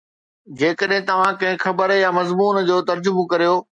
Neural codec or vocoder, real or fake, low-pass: none; real; 9.9 kHz